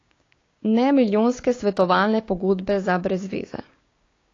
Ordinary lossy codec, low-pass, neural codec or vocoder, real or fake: AAC, 32 kbps; 7.2 kHz; codec, 16 kHz, 6 kbps, DAC; fake